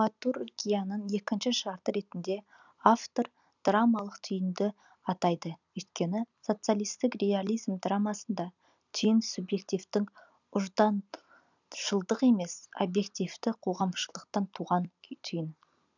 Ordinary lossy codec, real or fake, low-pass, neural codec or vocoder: none; real; 7.2 kHz; none